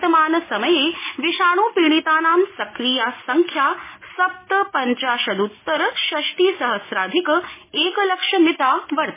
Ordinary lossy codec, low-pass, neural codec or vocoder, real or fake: MP3, 16 kbps; 3.6 kHz; none; real